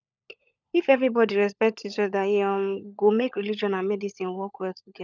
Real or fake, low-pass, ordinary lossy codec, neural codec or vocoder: fake; 7.2 kHz; none; codec, 16 kHz, 16 kbps, FunCodec, trained on LibriTTS, 50 frames a second